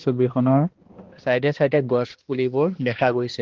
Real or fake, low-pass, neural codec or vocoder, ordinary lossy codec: fake; 7.2 kHz; codec, 16 kHz, 1 kbps, X-Codec, HuBERT features, trained on balanced general audio; Opus, 16 kbps